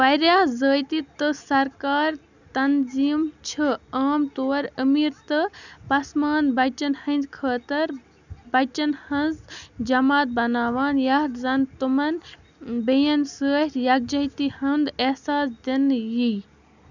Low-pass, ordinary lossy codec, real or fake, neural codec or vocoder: 7.2 kHz; none; real; none